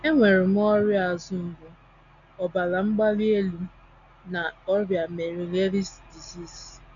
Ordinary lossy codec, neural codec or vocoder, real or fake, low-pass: none; none; real; 7.2 kHz